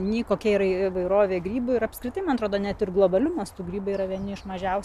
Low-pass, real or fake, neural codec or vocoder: 14.4 kHz; real; none